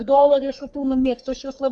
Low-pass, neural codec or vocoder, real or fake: 10.8 kHz; codec, 44.1 kHz, 3.4 kbps, Pupu-Codec; fake